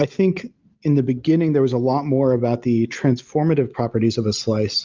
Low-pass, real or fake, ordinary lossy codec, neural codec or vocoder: 7.2 kHz; real; Opus, 32 kbps; none